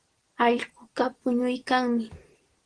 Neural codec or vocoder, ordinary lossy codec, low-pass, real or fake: none; Opus, 16 kbps; 9.9 kHz; real